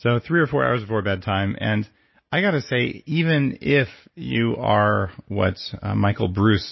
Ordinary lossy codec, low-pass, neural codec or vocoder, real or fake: MP3, 24 kbps; 7.2 kHz; none; real